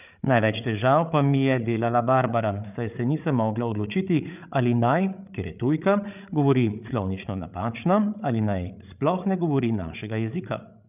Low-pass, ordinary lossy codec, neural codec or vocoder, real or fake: 3.6 kHz; none; codec, 16 kHz, 8 kbps, FreqCodec, larger model; fake